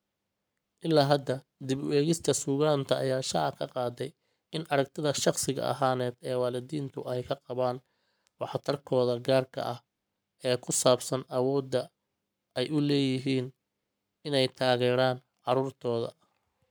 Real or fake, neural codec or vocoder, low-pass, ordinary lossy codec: fake; codec, 44.1 kHz, 7.8 kbps, Pupu-Codec; none; none